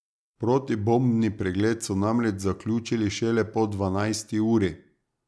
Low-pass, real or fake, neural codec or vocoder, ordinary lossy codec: none; real; none; none